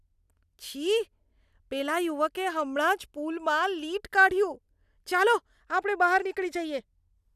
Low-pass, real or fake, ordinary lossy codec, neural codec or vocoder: 14.4 kHz; real; none; none